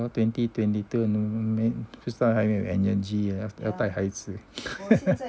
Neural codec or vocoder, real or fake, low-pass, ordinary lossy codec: none; real; none; none